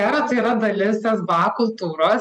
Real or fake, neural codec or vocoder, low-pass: real; none; 10.8 kHz